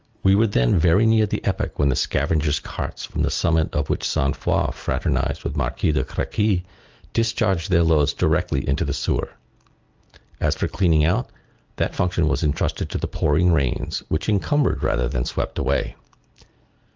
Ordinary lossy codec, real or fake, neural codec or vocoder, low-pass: Opus, 24 kbps; real; none; 7.2 kHz